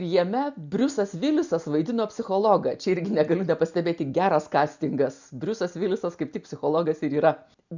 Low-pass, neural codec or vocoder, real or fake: 7.2 kHz; none; real